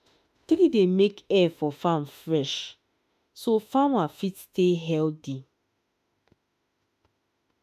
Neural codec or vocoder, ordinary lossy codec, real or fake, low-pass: autoencoder, 48 kHz, 32 numbers a frame, DAC-VAE, trained on Japanese speech; none; fake; 14.4 kHz